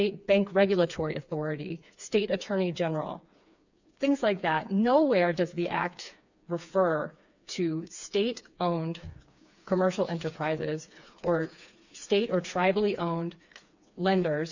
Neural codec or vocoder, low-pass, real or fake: codec, 16 kHz, 4 kbps, FreqCodec, smaller model; 7.2 kHz; fake